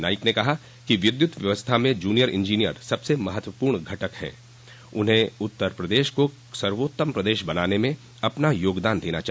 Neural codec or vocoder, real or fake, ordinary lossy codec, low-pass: none; real; none; none